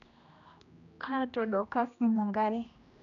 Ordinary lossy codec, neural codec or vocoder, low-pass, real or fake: none; codec, 16 kHz, 1 kbps, X-Codec, HuBERT features, trained on general audio; 7.2 kHz; fake